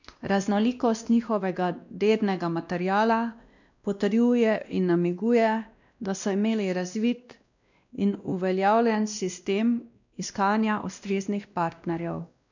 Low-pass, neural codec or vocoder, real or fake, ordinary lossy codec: 7.2 kHz; codec, 16 kHz, 1 kbps, X-Codec, WavLM features, trained on Multilingual LibriSpeech; fake; none